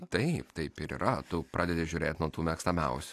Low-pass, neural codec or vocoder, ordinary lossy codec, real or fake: 14.4 kHz; none; AAC, 64 kbps; real